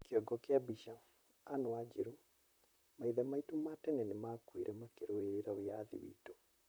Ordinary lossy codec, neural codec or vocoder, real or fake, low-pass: none; vocoder, 44.1 kHz, 128 mel bands, Pupu-Vocoder; fake; none